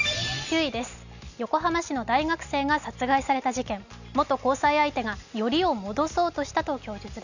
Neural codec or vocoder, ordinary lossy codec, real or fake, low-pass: none; none; real; 7.2 kHz